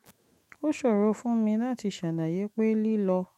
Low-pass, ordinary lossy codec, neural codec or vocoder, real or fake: 19.8 kHz; MP3, 64 kbps; autoencoder, 48 kHz, 128 numbers a frame, DAC-VAE, trained on Japanese speech; fake